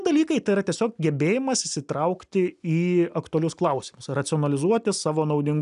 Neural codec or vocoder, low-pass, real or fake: none; 10.8 kHz; real